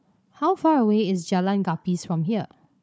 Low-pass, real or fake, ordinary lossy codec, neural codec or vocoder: none; fake; none; codec, 16 kHz, 4 kbps, FunCodec, trained on Chinese and English, 50 frames a second